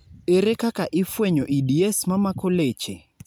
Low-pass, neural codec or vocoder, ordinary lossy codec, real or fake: none; none; none; real